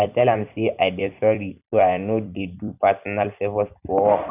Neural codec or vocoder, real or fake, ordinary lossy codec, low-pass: vocoder, 44.1 kHz, 128 mel bands every 256 samples, BigVGAN v2; fake; none; 3.6 kHz